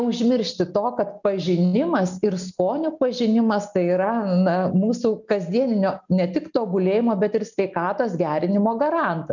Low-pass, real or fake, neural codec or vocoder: 7.2 kHz; real; none